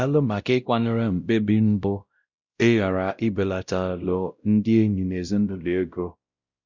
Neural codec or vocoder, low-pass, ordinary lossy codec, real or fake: codec, 16 kHz, 0.5 kbps, X-Codec, WavLM features, trained on Multilingual LibriSpeech; 7.2 kHz; Opus, 64 kbps; fake